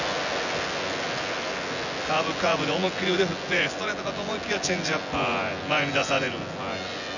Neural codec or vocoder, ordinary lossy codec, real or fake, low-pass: vocoder, 24 kHz, 100 mel bands, Vocos; none; fake; 7.2 kHz